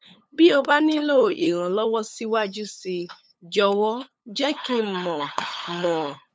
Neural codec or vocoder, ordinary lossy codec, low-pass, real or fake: codec, 16 kHz, 8 kbps, FunCodec, trained on LibriTTS, 25 frames a second; none; none; fake